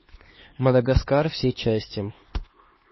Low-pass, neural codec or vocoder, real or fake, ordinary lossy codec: 7.2 kHz; codec, 16 kHz, 2 kbps, X-Codec, HuBERT features, trained on LibriSpeech; fake; MP3, 24 kbps